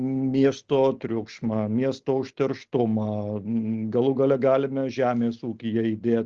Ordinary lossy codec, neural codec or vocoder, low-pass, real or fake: Opus, 24 kbps; none; 7.2 kHz; real